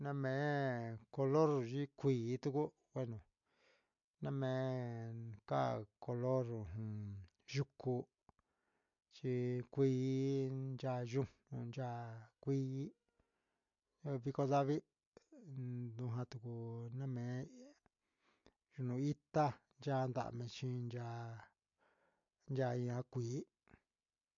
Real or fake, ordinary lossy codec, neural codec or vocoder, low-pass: real; MP3, 48 kbps; none; 7.2 kHz